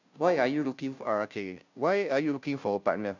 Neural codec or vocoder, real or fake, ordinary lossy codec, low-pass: codec, 16 kHz, 0.5 kbps, FunCodec, trained on Chinese and English, 25 frames a second; fake; none; 7.2 kHz